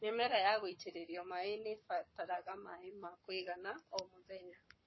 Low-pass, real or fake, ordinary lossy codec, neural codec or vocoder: 7.2 kHz; fake; MP3, 24 kbps; codec, 44.1 kHz, 7.8 kbps, Pupu-Codec